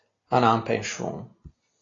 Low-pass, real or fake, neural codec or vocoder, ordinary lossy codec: 7.2 kHz; real; none; AAC, 32 kbps